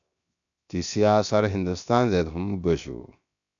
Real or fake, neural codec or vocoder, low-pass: fake; codec, 16 kHz, 0.7 kbps, FocalCodec; 7.2 kHz